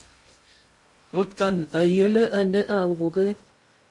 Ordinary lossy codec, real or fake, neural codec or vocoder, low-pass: MP3, 48 kbps; fake; codec, 16 kHz in and 24 kHz out, 0.6 kbps, FocalCodec, streaming, 2048 codes; 10.8 kHz